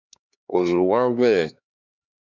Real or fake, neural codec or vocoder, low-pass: fake; codec, 16 kHz, 2 kbps, X-Codec, HuBERT features, trained on LibriSpeech; 7.2 kHz